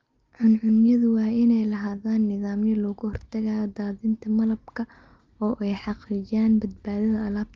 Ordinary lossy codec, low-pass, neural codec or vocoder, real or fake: Opus, 32 kbps; 7.2 kHz; none; real